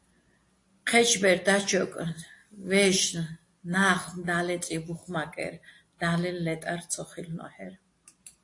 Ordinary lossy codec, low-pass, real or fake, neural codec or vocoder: AAC, 48 kbps; 10.8 kHz; real; none